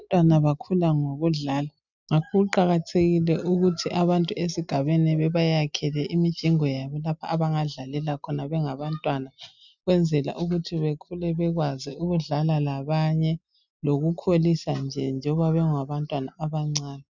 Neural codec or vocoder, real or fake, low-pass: none; real; 7.2 kHz